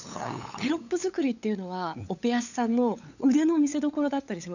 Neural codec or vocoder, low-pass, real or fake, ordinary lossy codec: codec, 16 kHz, 8 kbps, FunCodec, trained on LibriTTS, 25 frames a second; 7.2 kHz; fake; none